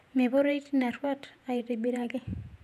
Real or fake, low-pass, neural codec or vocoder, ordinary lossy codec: fake; 14.4 kHz; vocoder, 48 kHz, 128 mel bands, Vocos; none